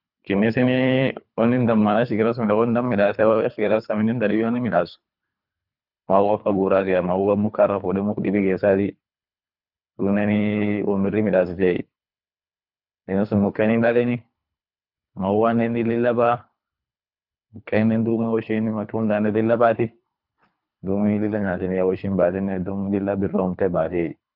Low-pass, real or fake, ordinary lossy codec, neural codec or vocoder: 5.4 kHz; fake; none; codec, 24 kHz, 3 kbps, HILCodec